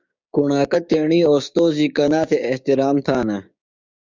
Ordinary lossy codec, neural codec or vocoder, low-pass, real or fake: Opus, 64 kbps; codec, 16 kHz, 6 kbps, DAC; 7.2 kHz; fake